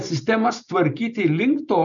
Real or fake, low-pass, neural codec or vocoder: real; 7.2 kHz; none